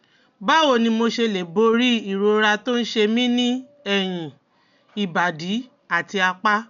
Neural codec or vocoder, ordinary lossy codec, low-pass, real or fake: none; none; 7.2 kHz; real